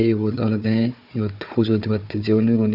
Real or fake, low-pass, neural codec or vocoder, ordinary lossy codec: fake; 5.4 kHz; codec, 16 kHz, 4 kbps, FunCodec, trained on Chinese and English, 50 frames a second; none